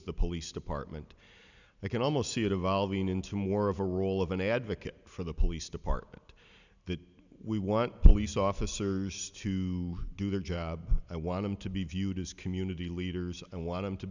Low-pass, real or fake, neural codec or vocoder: 7.2 kHz; real; none